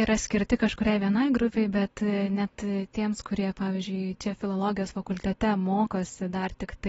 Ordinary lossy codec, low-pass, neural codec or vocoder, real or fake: AAC, 24 kbps; 9.9 kHz; none; real